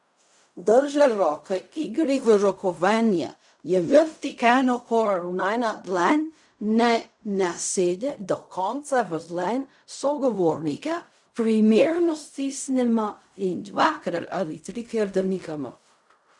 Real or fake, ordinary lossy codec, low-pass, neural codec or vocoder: fake; none; 10.8 kHz; codec, 16 kHz in and 24 kHz out, 0.4 kbps, LongCat-Audio-Codec, fine tuned four codebook decoder